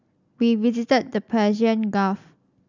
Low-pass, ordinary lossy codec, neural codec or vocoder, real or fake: 7.2 kHz; none; none; real